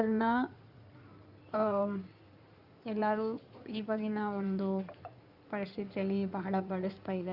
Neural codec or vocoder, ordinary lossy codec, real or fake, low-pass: codec, 16 kHz in and 24 kHz out, 2.2 kbps, FireRedTTS-2 codec; Opus, 64 kbps; fake; 5.4 kHz